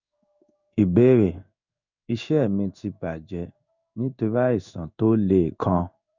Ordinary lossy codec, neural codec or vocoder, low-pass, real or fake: none; codec, 16 kHz in and 24 kHz out, 1 kbps, XY-Tokenizer; 7.2 kHz; fake